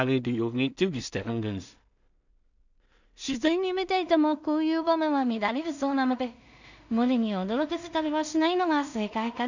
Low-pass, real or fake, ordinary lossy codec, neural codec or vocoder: 7.2 kHz; fake; none; codec, 16 kHz in and 24 kHz out, 0.4 kbps, LongCat-Audio-Codec, two codebook decoder